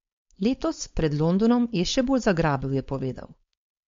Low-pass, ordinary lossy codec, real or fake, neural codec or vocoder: 7.2 kHz; MP3, 48 kbps; fake; codec, 16 kHz, 4.8 kbps, FACodec